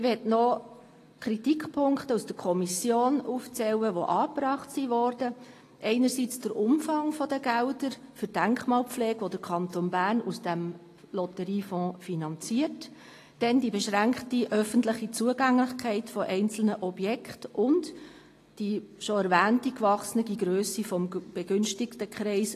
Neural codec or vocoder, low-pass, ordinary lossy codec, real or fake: none; 14.4 kHz; AAC, 48 kbps; real